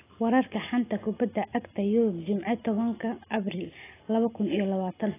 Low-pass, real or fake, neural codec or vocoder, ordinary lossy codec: 3.6 kHz; real; none; AAC, 16 kbps